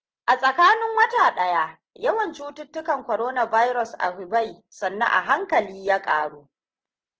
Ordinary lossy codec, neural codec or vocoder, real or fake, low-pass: Opus, 16 kbps; none; real; 7.2 kHz